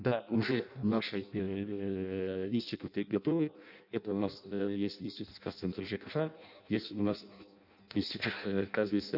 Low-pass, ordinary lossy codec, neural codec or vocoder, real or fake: 5.4 kHz; none; codec, 16 kHz in and 24 kHz out, 0.6 kbps, FireRedTTS-2 codec; fake